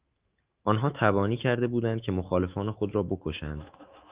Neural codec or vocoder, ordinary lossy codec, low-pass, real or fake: autoencoder, 48 kHz, 128 numbers a frame, DAC-VAE, trained on Japanese speech; Opus, 32 kbps; 3.6 kHz; fake